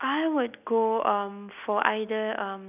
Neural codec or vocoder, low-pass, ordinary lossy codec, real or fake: none; 3.6 kHz; none; real